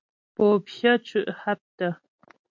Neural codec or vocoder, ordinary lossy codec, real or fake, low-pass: none; MP3, 48 kbps; real; 7.2 kHz